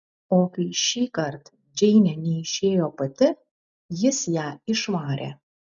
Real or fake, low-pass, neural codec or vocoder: real; 7.2 kHz; none